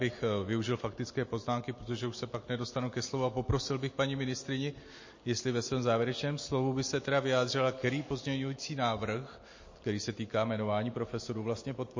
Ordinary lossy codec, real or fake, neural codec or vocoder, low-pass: MP3, 32 kbps; real; none; 7.2 kHz